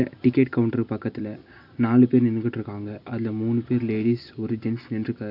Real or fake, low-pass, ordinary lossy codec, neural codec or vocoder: real; 5.4 kHz; AAC, 48 kbps; none